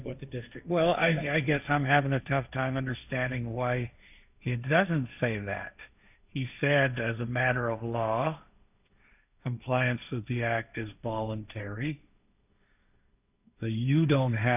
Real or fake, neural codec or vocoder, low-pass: fake; codec, 16 kHz, 1.1 kbps, Voila-Tokenizer; 3.6 kHz